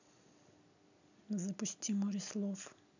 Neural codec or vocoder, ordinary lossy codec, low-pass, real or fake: none; none; 7.2 kHz; real